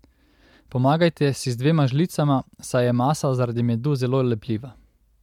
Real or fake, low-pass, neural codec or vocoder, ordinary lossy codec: real; 19.8 kHz; none; MP3, 96 kbps